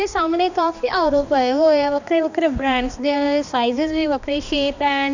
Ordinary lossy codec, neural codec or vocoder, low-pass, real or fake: none; codec, 16 kHz, 2 kbps, X-Codec, HuBERT features, trained on balanced general audio; 7.2 kHz; fake